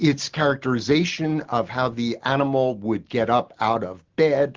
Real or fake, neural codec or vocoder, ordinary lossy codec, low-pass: real; none; Opus, 16 kbps; 7.2 kHz